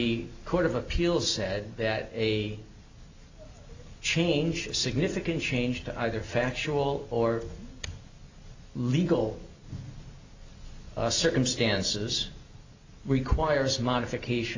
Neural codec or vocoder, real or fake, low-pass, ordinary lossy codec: none; real; 7.2 kHz; AAC, 48 kbps